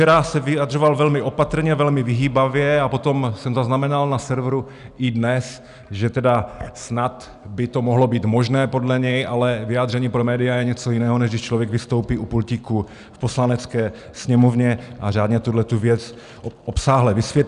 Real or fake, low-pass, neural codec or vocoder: real; 10.8 kHz; none